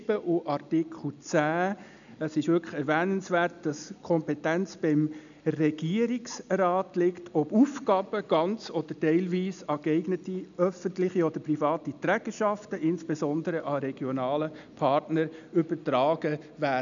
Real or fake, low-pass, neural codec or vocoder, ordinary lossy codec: real; 7.2 kHz; none; none